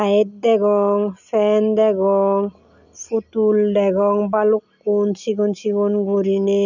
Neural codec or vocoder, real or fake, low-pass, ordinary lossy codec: none; real; 7.2 kHz; none